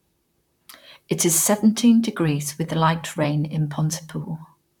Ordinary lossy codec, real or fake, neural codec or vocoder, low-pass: none; fake; vocoder, 44.1 kHz, 128 mel bands, Pupu-Vocoder; 19.8 kHz